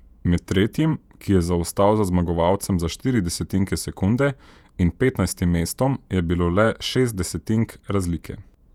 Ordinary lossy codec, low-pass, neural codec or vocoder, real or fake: none; 19.8 kHz; vocoder, 48 kHz, 128 mel bands, Vocos; fake